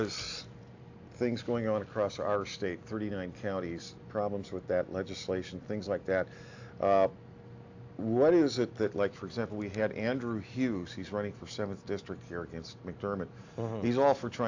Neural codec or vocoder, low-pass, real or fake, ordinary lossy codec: none; 7.2 kHz; real; AAC, 48 kbps